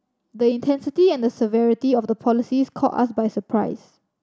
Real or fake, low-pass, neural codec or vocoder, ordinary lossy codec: real; none; none; none